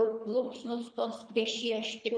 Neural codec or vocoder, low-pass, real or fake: codec, 24 kHz, 3 kbps, HILCodec; 9.9 kHz; fake